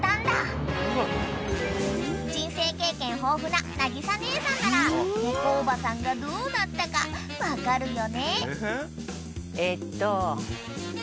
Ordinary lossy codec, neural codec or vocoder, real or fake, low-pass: none; none; real; none